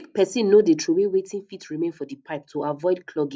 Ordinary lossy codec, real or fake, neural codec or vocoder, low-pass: none; real; none; none